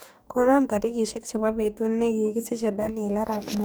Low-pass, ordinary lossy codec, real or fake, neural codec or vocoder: none; none; fake; codec, 44.1 kHz, 2.6 kbps, DAC